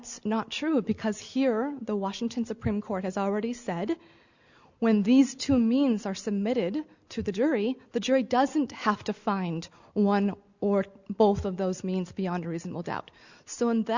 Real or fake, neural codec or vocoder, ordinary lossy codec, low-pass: real; none; Opus, 64 kbps; 7.2 kHz